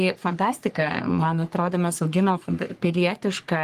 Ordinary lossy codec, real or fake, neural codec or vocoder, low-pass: Opus, 24 kbps; fake; codec, 44.1 kHz, 3.4 kbps, Pupu-Codec; 14.4 kHz